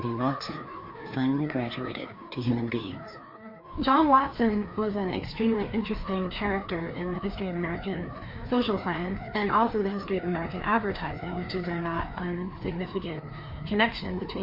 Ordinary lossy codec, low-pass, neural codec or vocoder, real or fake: MP3, 32 kbps; 5.4 kHz; codec, 16 kHz, 2 kbps, FreqCodec, larger model; fake